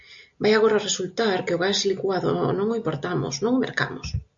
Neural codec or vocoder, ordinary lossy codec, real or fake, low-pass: none; AAC, 64 kbps; real; 7.2 kHz